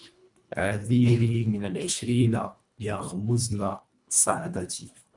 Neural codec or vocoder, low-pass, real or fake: codec, 24 kHz, 1.5 kbps, HILCodec; 10.8 kHz; fake